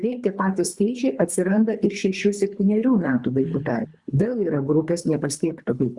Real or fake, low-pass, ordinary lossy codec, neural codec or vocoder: fake; 10.8 kHz; Opus, 64 kbps; codec, 24 kHz, 3 kbps, HILCodec